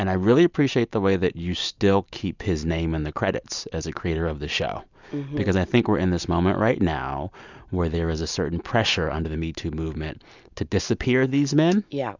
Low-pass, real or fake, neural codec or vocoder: 7.2 kHz; real; none